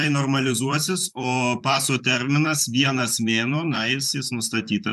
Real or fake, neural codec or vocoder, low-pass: fake; vocoder, 44.1 kHz, 128 mel bands, Pupu-Vocoder; 14.4 kHz